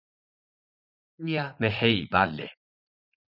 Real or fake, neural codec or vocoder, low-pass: fake; vocoder, 44.1 kHz, 80 mel bands, Vocos; 5.4 kHz